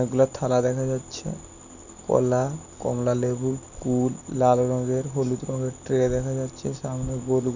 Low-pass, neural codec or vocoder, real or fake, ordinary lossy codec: 7.2 kHz; none; real; none